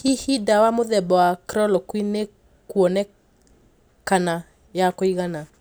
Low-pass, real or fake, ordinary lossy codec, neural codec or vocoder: none; real; none; none